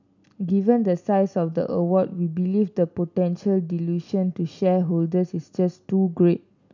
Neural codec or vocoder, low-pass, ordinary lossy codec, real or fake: none; 7.2 kHz; none; real